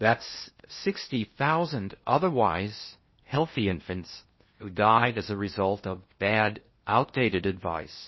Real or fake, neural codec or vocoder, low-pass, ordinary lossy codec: fake; codec, 16 kHz in and 24 kHz out, 0.8 kbps, FocalCodec, streaming, 65536 codes; 7.2 kHz; MP3, 24 kbps